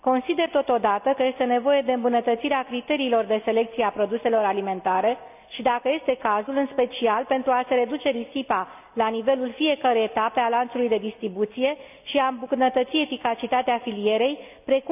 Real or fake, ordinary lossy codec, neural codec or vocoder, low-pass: real; none; none; 3.6 kHz